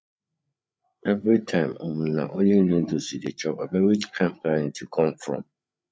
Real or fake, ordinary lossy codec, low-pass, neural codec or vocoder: fake; none; none; codec, 16 kHz, 8 kbps, FreqCodec, larger model